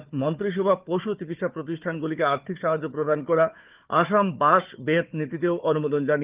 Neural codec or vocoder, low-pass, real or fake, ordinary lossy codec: codec, 16 kHz, 4 kbps, FunCodec, trained on Chinese and English, 50 frames a second; 3.6 kHz; fake; Opus, 32 kbps